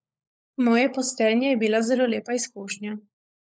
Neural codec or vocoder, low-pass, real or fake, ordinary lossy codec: codec, 16 kHz, 16 kbps, FunCodec, trained on LibriTTS, 50 frames a second; none; fake; none